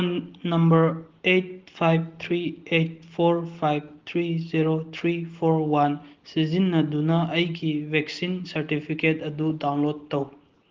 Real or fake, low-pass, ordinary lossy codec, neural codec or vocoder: real; 7.2 kHz; Opus, 16 kbps; none